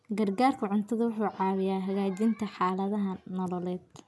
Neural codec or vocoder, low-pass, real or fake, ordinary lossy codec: none; none; real; none